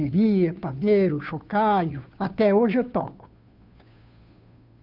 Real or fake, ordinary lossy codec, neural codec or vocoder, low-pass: fake; none; codec, 16 kHz, 2 kbps, FunCodec, trained on Chinese and English, 25 frames a second; 5.4 kHz